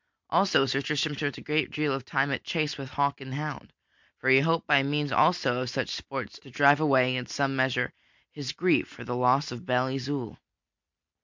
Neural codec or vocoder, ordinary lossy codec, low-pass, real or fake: none; MP3, 64 kbps; 7.2 kHz; real